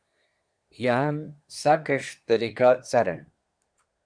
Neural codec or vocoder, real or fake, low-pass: codec, 24 kHz, 1 kbps, SNAC; fake; 9.9 kHz